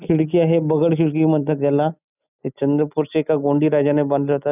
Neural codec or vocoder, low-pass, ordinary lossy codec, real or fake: none; 3.6 kHz; none; real